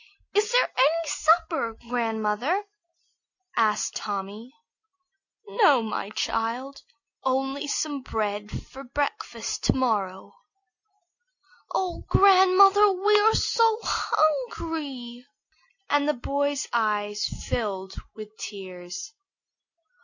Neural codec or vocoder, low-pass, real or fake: none; 7.2 kHz; real